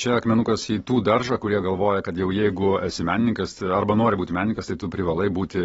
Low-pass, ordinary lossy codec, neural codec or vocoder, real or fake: 10.8 kHz; AAC, 24 kbps; none; real